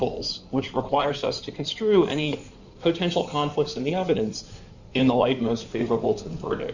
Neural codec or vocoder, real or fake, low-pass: codec, 16 kHz in and 24 kHz out, 2.2 kbps, FireRedTTS-2 codec; fake; 7.2 kHz